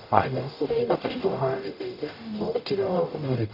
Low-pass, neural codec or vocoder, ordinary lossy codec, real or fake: 5.4 kHz; codec, 44.1 kHz, 0.9 kbps, DAC; none; fake